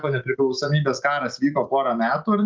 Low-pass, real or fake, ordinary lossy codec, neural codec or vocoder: 7.2 kHz; real; Opus, 24 kbps; none